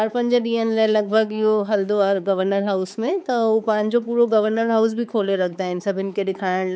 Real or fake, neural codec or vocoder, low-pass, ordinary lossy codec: fake; codec, 16 kHz, 4 kbps, X-Codec, HuBERT features, trained on balanced general audio; none; none